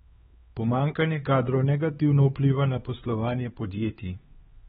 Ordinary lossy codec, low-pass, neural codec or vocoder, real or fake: AAC, 16 kbps; 7.2 kHz; codec, 16 kHz, 4 kbps, X-Codec, HuBERT features, trained on LibriSpeech; fake